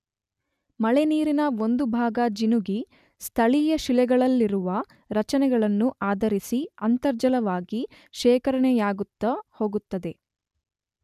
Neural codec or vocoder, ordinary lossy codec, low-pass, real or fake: none; none; 14.4 kHz; real